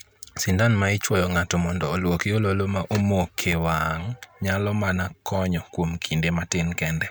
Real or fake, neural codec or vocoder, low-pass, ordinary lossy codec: real; none; none; none